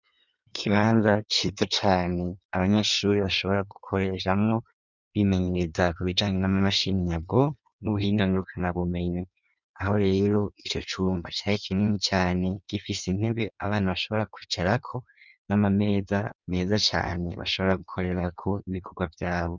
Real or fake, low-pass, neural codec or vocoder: fake; 7.2 kHz; codec, 16 kHz in and 24 kHz out, 1.1 kbps, FireRedTTS-2 codec